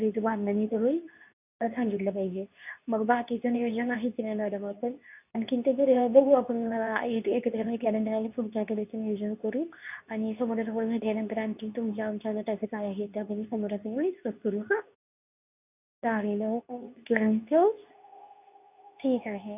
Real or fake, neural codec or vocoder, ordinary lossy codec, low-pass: fake; codec, 24 kHz, 0.9 kbps, WavTokenizer, medium speech release version 2; none; 3.6 kHz